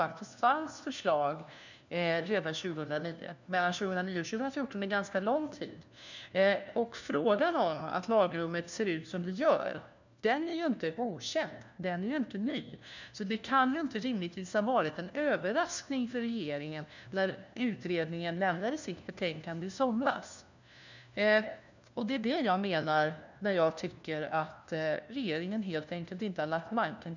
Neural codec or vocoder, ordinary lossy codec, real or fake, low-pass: codec, 16 kHz, 1 kbps, FunCodec, trained on LibriTTS, 50 frames a second; none; fake; 7.2 kHz